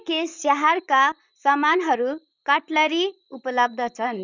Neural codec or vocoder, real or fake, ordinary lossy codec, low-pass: vocoder, 44.1 kHz, 128 mel bands, Pupu-Vocoder; fake; none; 7.2 kHz